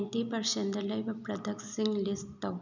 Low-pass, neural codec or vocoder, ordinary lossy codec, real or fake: 7.2 kHz; none; none; real